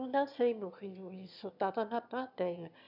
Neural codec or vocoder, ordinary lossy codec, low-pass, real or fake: autoencoder, 22.05 kHz, a latent of 192 numbers a frame, VITS, trained on one speaker; none; 5.4 kHz; fake